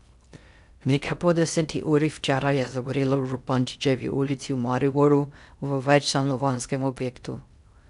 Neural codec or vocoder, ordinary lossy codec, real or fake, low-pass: codec, 16 kHz in and 24 kHz out, 0.6 kbps, FocalCodec, streaming, 2048 codes; none; fake; 10.8 kHz